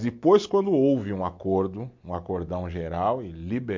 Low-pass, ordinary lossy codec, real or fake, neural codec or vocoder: 7.2 kHz; MP3, 48 kbps; real; none